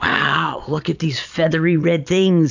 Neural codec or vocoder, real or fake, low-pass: vocoder, 22.05 kHz, 80 mel bands, Vocos; fake; 7.2 kHz